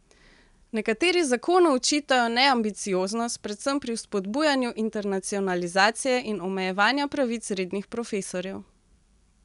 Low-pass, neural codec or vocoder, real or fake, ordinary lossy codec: 10.8 kHz; none; real; none